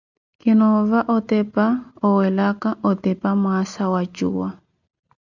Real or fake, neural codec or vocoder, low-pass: real; none; 7.2 kHz